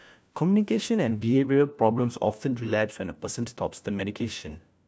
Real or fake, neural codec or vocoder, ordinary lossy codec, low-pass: fake; codec, 16 kHz, 1 kbps, FunCodec, trained on LibriTTS, 50 frames a second; none; none